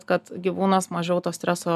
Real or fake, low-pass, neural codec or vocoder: real; 14.4 kHz; none